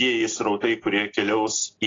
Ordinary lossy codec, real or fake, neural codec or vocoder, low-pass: AAC, 32 kbps; real; none; 7.2 kHz